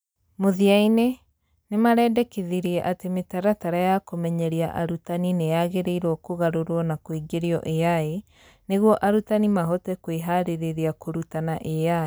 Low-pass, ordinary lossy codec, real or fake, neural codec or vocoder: none; none; real; none